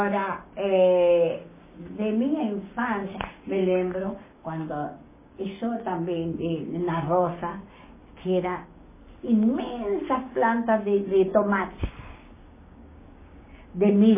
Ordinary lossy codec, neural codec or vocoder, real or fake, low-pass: MP3, 16 kbps; codec, 16 kHz, 6 kbps, DAC; fake; 3.6 kHz